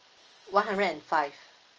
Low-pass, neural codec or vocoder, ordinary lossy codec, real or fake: 7.2 kHz; none; Opus, 24 kbps; real